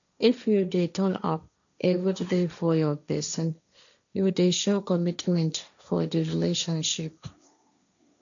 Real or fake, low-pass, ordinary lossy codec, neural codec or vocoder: fake; 7.2 kHz; none; codec, 16 kHz, 1.1 kbps, Voila-Tokenizer